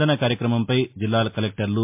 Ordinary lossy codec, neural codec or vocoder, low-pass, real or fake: MP3, 32 kbps; none; 3.6 kHz; real